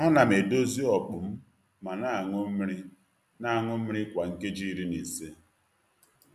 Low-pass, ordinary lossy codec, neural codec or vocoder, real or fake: 14.4 kHz; none; none; real